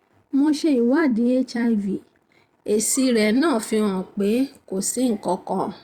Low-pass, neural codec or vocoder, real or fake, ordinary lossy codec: 19.8 kHz; vocoder, 44.1 kHz, 128 mel bands every 256 samples, BigVGAN v2; fake; Opus, 64 kbps